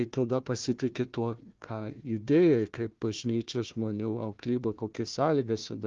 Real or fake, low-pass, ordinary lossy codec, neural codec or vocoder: fake; 7.2 kHz; Opus, 32 kbps; codec, 16 kHz, 1 kbps, FunCodec, trained on Chinese and English, 50 frames a second